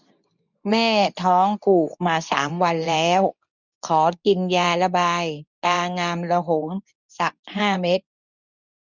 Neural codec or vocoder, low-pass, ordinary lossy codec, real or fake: codec, 24 kHz, 0.9 kbps, WavTokenizer, medium speech release version 2; 7.2 kHz; none; fake